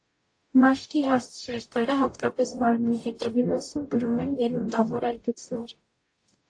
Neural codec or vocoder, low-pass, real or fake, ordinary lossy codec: codec, 44.1 kHz, 0.9 kbps, DAC; 9.9 kHz; fake; AAC, 48 kbps